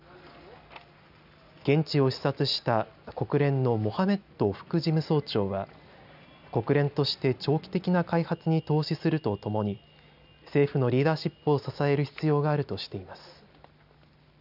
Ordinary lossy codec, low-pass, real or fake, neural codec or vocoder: none; 5.4 kHz; real; none